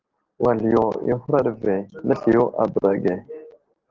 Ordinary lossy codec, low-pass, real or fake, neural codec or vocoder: Opus, 16 kbps; 7.2 kHz; real; none